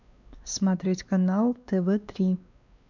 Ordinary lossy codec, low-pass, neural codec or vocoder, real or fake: none; 7.2 kHz; codec, 16 kHz, 2 kbps, X-Codec, WavLM features, trained on Multilingual LibriSpeech; fake